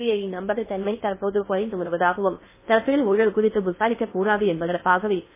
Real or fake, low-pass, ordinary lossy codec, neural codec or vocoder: fake; 3.6 kHz; MP3, 16 kbps; codec, 16 kHz in and 24 kHz out, 0.6 kbps, FocalCodec, streaming, 2048 codes